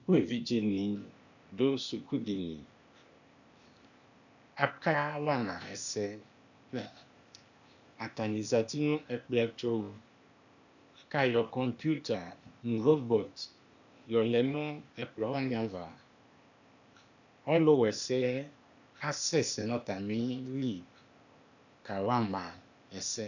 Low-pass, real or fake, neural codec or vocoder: 7.2 kHz; fake; codec, 16 kHz, 0.8 kbps, ZipCodec